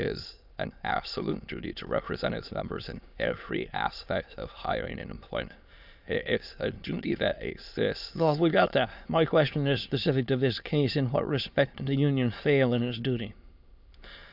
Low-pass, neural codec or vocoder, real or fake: 5.4 kHz; autoencoder, 22.05 kHz, a latent of 192 numbers a frame, VITS, trained on many speakers; fake